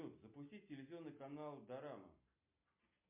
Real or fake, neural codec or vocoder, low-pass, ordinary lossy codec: real; none; 3.6 kHz; MP3, 32 kbps